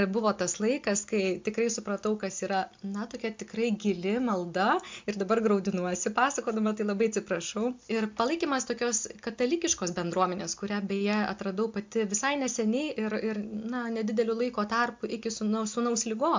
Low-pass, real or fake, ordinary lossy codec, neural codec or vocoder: 7.2 kHz; real; MP3, 64 kbps; none